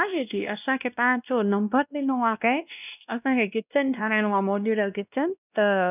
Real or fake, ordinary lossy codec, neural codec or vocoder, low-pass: fake; none; codec, 16 kHz, 1 kbps, X-Codec, WavLM features, trained on Multilingual LibriSpeech; 3.6 kHz